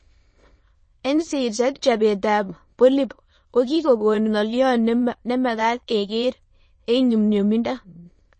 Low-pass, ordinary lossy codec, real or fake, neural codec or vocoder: 9.9 kHz; MP3, 32 kbps; fake; autoencoder, 22.05 kHz, a latent of 192 numbers a frame, VITS, trained on many speakers